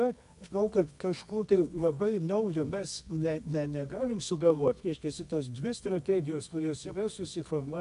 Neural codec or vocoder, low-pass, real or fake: codec, 24 kHz, 0.9 kbps, WavTokenizer, medium music audio release; 10.8 kHz; fake